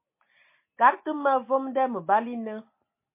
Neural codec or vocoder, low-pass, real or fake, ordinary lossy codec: none; 3.6 kHz; real; MP3, 24 kbps